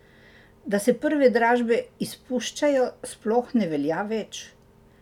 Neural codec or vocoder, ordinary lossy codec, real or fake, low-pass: none; none; real; 19.8 kHz